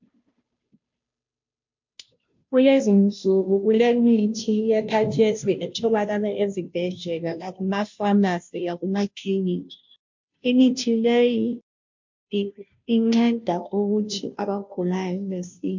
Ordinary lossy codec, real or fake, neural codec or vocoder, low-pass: MP3, 48 kbps; fake; codec, 16 kHz, 0.5 kbps, FunCodec, trained on Chinese and English, 25 frames a second; 7.2 kHz